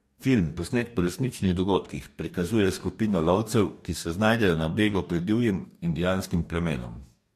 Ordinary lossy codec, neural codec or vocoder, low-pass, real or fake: MP3, 64 kbps; codec, 44.1 kHz, 2.6 kbps, DAC; 14.4 kHz; fake